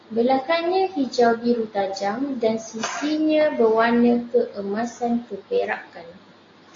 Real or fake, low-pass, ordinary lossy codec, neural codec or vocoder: real; 7.2 kHz; AAC, 32 kbps; none